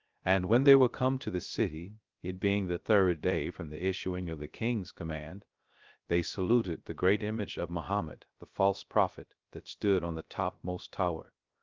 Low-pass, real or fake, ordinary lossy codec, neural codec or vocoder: 7.2 kHz; fake; Opus, 32 kbps; codec, 16 kHz, 0.3 kbps, FocalCodec